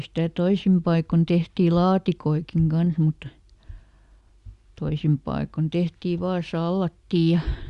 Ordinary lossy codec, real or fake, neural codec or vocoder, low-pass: Opus, 64 kbps; real; none; 10.8 kHz